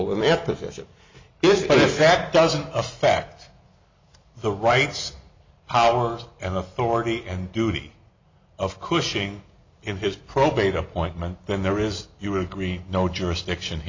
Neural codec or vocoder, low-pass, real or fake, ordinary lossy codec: none; 7.2 kHz; real; MP3, 64 kbps